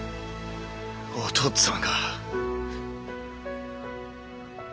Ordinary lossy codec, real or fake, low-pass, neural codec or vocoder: none; real; none; none